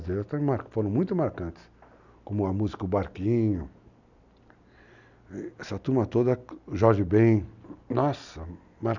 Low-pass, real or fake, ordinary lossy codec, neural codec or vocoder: 7.2 kHz; real; none; none